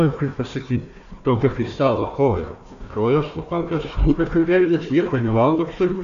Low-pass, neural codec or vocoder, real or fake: 7.2 kHz; codec, 16 kHz, 1 kbps, FunCodec, trained on Chinese and English, 50 frames a second; fake